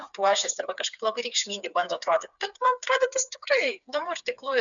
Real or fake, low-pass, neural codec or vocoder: fake; 7.2 kHz; codec, 16 kHz, 4 kbps, FreqCodec, smaller model